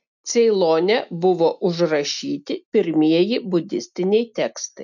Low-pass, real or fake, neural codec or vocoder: 7.2 kHz; real; none